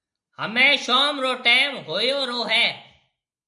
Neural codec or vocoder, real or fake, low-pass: none; real; 10.8 kHz